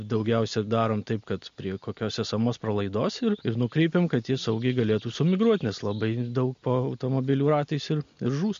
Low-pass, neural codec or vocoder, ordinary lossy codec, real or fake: 7.2 kHz; none; MP3, 48 kbps; real